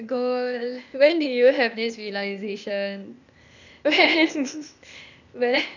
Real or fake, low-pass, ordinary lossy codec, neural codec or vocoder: fake; 7.2 kHz; none; codec, 16 kHz, 0.8 kbps, ZipCodec